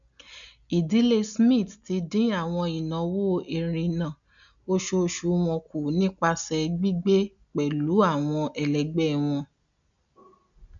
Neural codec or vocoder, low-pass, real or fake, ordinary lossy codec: none; 7.2 kHz; real; none